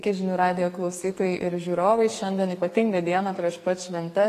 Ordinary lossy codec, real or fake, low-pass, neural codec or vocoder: AAC, 48 kbps; fake; 14.4 kHz; codec, 44.1 kHz, 2.6 kbps, SNAC